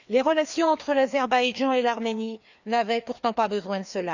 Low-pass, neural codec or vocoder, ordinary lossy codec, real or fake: 7.2 kHz; codec, 16 kHz, 2 kbps, FreqCodec, larger model; none; fake